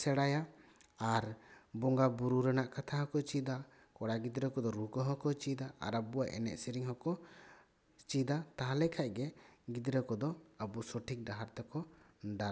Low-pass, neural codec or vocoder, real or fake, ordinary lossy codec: none; none; real; none